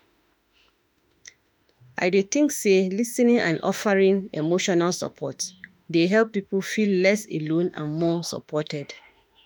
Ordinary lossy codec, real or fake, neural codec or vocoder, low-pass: none; fake; autoencoder, 48 kHz, 32 numbers a frame, DAC-VAE, trained on Japanese speech; none